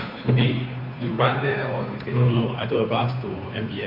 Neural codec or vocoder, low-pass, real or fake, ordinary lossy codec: codec, 16 kHz, 2 kbps, FunCodec, trained on Chinese and English, 25 frames a second; 5.4 kHz; fake; none